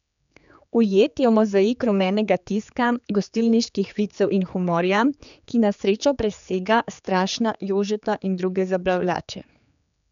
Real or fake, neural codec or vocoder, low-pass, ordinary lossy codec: fake; codec, 16 kHz, 4 kbps, X-Codec, HuBERT features, trained on general audio; 7.2 kHz; none